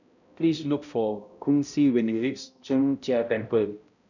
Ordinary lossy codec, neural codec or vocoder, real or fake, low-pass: none; codec, 16 kHz, 0.5 kbps, X-Codec, HuBERT features, trained on balanced general audio; fake; 7.2 kHz